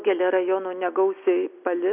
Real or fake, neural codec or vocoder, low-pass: real; none; 3.6 kHz